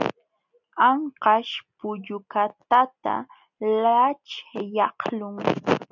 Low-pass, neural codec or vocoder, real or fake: 7.2 kHz; none; real